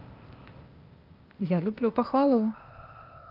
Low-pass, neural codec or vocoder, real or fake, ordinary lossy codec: 5.4 kHz; codec, 16 kHz, 0.8 kbps, ZipCodec; fake; Opus, 24 kbps